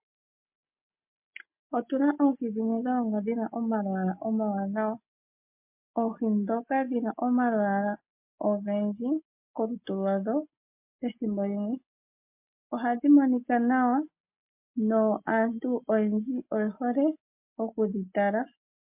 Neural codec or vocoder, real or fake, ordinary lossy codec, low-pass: none; real; MP3, 32 kbps; 3.6 kHz